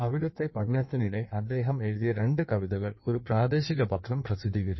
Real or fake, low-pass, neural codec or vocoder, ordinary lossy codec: fake; 7.2 kHz; codec, 16 kHz in and 24 kHz out, 1.1 kbps, FireRedTTS-2 codec; MP3, 24 kbps